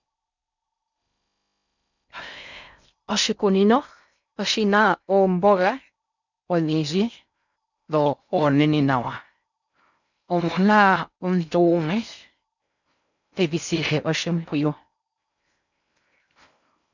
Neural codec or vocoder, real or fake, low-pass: codec, 16 kHz in and 24 kHz out, 0.6 kbps, FocalCodec, streaming, 4096 codes; fake; 7.2 kHz